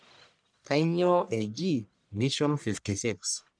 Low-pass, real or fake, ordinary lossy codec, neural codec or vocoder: 9.9 kHz; fake; none; codec, 44.1 kHz, 1.7 kbps, Pupu-Codec